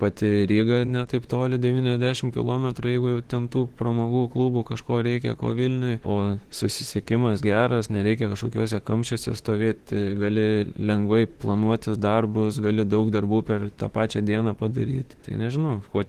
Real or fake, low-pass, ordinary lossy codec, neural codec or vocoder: fake; 14.4 kHz; Opus, 16 kbps; autoencoder, 48 kHz, 32 numbers a frame, DAC-VAE, trained on Japanese speech